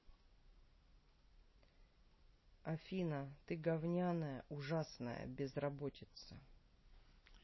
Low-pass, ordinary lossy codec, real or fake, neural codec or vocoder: 7.2 kHz; MP3, 24 kbps; real; none